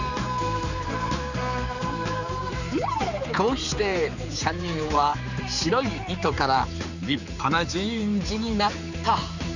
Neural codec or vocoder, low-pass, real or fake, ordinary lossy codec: codec, 16 kHz, 4 kbps, X-Codec, HuBERT features, trained on general audio; 7.2 kHz; fake; none